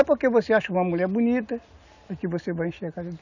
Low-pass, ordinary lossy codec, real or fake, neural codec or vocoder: 7.2 kHz; none; real; none